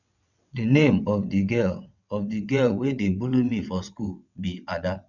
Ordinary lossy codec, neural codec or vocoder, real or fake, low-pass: none; vocoder, 22.05 kHz, 80 mel bands, WaveNeXt; fake; 7.2 kHz